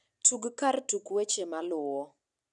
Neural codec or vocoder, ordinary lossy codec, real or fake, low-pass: none; none; real; 10.8 kHz